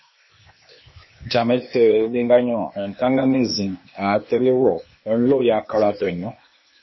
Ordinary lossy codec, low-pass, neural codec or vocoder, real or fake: MP3, 24 kbps; 7.2 kHz; codec, 16 kHz, 0.8 kbps, ZipCodec; fake